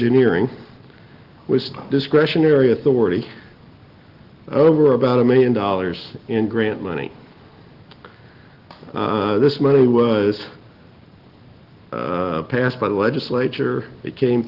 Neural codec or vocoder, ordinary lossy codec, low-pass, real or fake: none; Opus, 16 kbps; 5.4 kHz; real